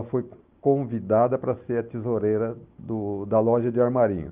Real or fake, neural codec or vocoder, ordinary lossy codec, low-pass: real; none; Opus, 32 kbps; 3.6 kHz